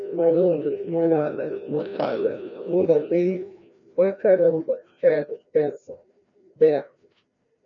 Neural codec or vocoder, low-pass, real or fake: codec, 16 kHz, 1 kbps, FreqCodec, larger model; 7.2 kHz; fake